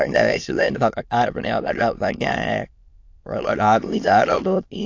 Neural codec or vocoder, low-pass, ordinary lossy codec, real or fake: autoencoder, 22.05 kHz, a latent of 192 numbers a frame, VITS, trained on many speakers; 7.2 kHz; AAC, 48 kbps; fake